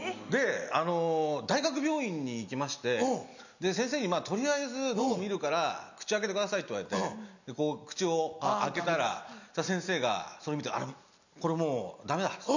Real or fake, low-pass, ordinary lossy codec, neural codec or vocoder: real; 7.2 kHz; none; none